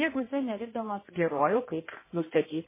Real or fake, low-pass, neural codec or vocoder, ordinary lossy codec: fake; 3.6 kHz; codec, 16 kHz in and 24 kHz out, 1.1 kbps, FireRedTTS-2 codec; MP3, 16 kbps